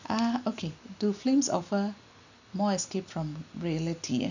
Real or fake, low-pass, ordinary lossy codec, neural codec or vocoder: real; 7.2 kHz; none; none